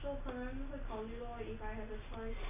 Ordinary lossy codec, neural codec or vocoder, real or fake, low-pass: none; none; real; 3.6 kHz